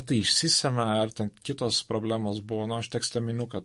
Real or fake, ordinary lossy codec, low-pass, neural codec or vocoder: fake; MP3, 48 kbps; 14.4 kHz; codec, 44.1 kHz, 7.8 kbps, DAC